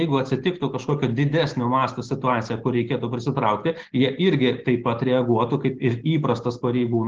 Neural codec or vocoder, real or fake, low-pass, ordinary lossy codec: none; real; 7.2 kHz; Opus, 16 kbps